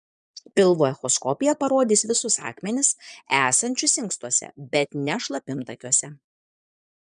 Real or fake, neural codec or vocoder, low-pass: fake; vocoder, 22.05 kHz, 80 mel bands, Vocos; 9.9 kHz